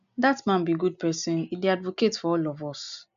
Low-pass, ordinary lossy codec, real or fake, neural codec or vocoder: 7.2 kHz; none; real; none